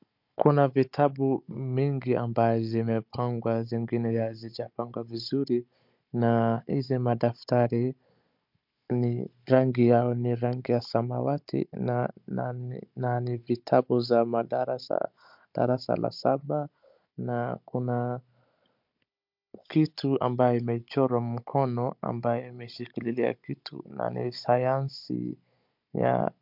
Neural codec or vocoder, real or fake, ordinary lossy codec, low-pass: codec, 16 kHz, 16 kbps, FunCodec, trained on Chinese and English, 50 frames a second; fake; MP3, 48 kbps; 5.4 kHz